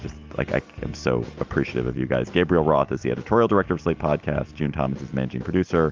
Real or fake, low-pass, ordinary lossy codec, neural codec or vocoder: real; 7.2 kHz; Opus, 32 kbps; none